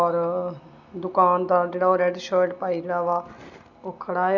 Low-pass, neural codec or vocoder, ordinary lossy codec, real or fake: 7.2 kHz; none; none; real